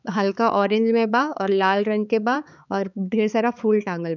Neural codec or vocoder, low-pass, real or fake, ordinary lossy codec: codec, 16 kHz, 4 kbps, X-Codec, HuBERT features, trained on balanced general audio; 7.2 kHz; fake; none